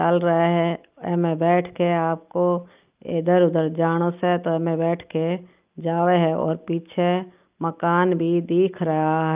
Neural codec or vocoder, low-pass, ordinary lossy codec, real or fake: none; 3.6 kHz; Opus, 24 kbps; real